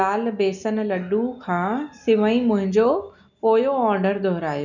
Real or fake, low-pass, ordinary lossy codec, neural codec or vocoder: real; 7.2 kHz; none; none